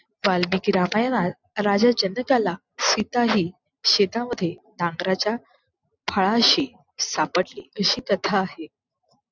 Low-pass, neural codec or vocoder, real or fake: 7.2 kHz; none; real